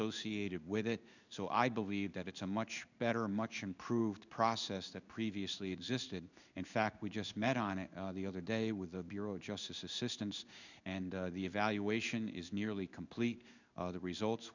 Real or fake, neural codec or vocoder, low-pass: fake; codec, 16 kHz in and 24 kHz out, 1 kbps, XY-Tokenizer; 7.2 kHz